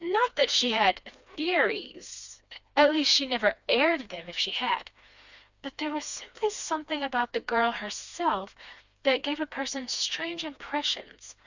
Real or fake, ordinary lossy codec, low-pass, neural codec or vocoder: fake; Opus, 64 kbps; 7.2 kHz; codec, 16 kHz, 2 kbps, FreqCodec, smaller model